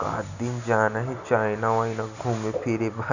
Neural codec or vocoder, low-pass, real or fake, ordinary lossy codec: none; 7.2 kHz; real; none